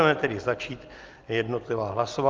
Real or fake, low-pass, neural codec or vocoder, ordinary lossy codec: real; 7.2 kHz; none; Opus, 32 kbps